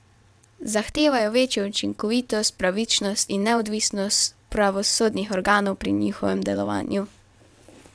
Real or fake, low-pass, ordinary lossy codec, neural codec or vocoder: fake; none; none; vocoder, 22.05 kHz, 80 mel bands, WaveNeXt